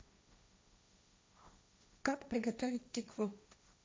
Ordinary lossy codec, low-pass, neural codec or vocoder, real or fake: none; none; codec, 16 kHz, 1.1 kbps, Voila-Tokenizer; fake